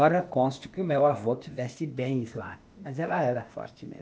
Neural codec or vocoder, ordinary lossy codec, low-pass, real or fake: codec, 16 kHz, 0.8 kbps, ZipCodec; none; none; fake